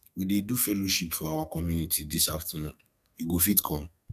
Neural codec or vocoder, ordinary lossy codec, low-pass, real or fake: codec, 32 kHz, 1.9 kbps, SNAC; none; 14.4 kHz; fake